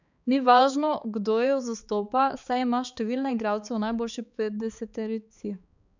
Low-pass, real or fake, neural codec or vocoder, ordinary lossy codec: 7.2 kHz; fake; codec, 16 kHz, 4 kbps, X-Codec, HuBERT features, trained on balanced general audio; none